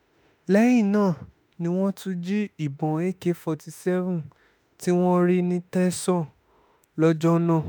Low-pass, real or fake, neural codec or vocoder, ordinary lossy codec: none; fake; autoencoder, 48 kHz, 32 numbers a frame, DAC-VAE, trained on Japanese speech; none